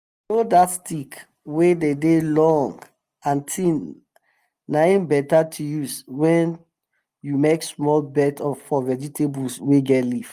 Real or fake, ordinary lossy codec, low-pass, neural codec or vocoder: real; Opus, 32 kbps; 14.4 kHz; none